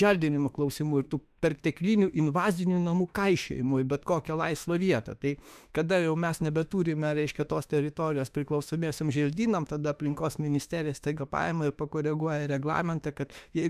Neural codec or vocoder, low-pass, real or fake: autoencoder, 48 kHz, 32 numbers a frame, DAC-VAE, trained on Japanese speech; 14.4 kHz; fake